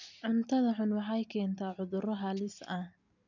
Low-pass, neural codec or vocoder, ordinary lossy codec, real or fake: 7.2 kHz; none; none; real